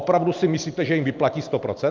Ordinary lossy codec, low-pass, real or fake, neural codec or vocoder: Opus, 24 kbps; 7.2 kHz; real; none